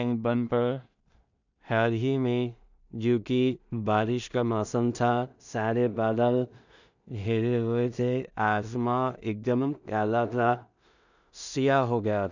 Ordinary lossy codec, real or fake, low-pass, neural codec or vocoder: none; fake; 7.2 kHz; codec, 16 kHz in and 24 kHz out, 0.4 kbps, LongCat-Audio-Codec, two codebook decoder